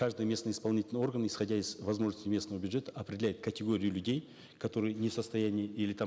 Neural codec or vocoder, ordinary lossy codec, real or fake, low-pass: none; none; real; none